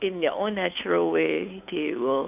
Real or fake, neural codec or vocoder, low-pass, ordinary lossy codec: fake; codec, 16 kHz, 2 kbps, FunCodec, trained on Chinese and English, 25 frames a second; 3.6 kHz; none